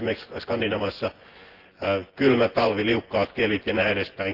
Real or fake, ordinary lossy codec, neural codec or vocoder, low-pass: fake; Opus, 16 kbps; vocoder, 24 kHz, 100 mel bands, Vocos; 5.4 kHz